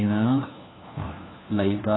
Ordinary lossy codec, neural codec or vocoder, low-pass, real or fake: AAC, 16 kbps; codec, 16 kHz, 1 kbps, FunCodec, trained on LibriTTS, 50 frames a second; 7.2 kHz; fake